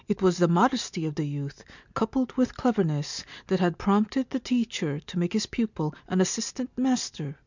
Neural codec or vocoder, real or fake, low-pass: none; real; 7.2 kHz